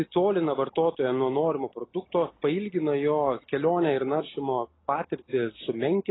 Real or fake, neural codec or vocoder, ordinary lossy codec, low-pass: real; none; AAC, 16 kbps; 7.2 kHz